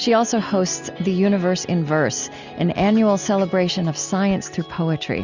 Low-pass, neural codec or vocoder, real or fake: 7.2 kHz; none; real